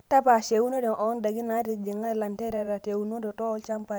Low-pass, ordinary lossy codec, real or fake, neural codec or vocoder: none; none; fake; vocoder, 44.1 kHz, 128 mel bands every 512 samples, BigVGAN v2